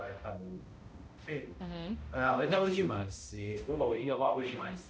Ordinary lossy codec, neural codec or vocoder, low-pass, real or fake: none; codec, 16 kHz, 0.5 kbps, X-Codec, HuBERT features, trained on balanced general audio; none; fake